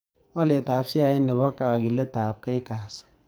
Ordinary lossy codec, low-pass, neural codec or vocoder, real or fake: none; none; codec, 44.1 kHz, 2.6 kbps, SNAC; fake